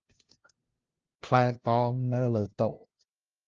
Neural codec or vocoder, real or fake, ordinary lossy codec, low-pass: codec, 16 kHz, 0.5 kbps, FunCodec, trained on LibriTTS, 25 frames a second; fake; Opus, 16 kbps; 7.2 kHz